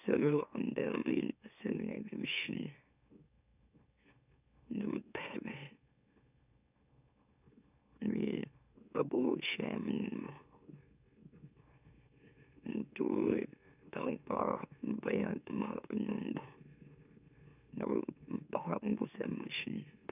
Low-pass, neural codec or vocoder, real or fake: 3.6 kHz; autoencoder, 44.1 kHz, a latent of 192 numbers a frame, MeloTTS; fake